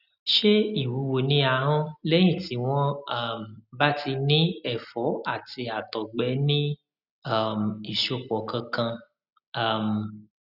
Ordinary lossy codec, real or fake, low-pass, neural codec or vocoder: none; real; 5.4 kHz; none